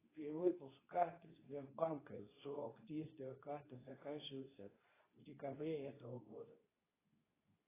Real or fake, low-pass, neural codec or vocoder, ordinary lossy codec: fake; 3.6 kHz; codec, 24 kHz, 0.9 kbps, WavTokenizer, medium speech release version 2; AAC, 16 kbps